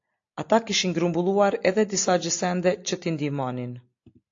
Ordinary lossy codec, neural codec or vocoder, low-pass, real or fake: AAC, 48 kbps; none; 7.2 kHz; real